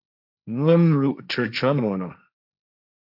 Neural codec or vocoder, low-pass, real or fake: codec, 16 kHz, 1.1 kbps, Voila-Tokenizer; 5.4 kHz; fake